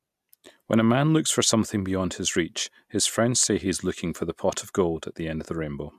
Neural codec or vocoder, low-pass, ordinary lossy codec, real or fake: none; 14.4 kHz; MP3, 96 kbps; real